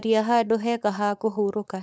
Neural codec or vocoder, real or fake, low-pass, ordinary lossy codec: codec, 16 kHz, 4.8 kbps, FACodec; fake; none; none